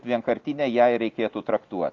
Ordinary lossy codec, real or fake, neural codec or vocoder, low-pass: Opus, 24 kbps; real; none; 7.2 kHz